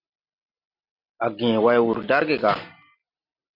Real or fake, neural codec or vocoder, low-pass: real; none; 5.4 kHz